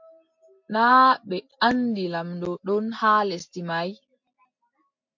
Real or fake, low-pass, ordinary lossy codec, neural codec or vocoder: real; 7.2 kHz; MP3, 48 kbps; none